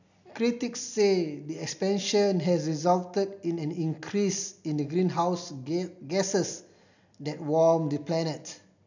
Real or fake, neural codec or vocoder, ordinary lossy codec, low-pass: real; none; none; 7.2 kHz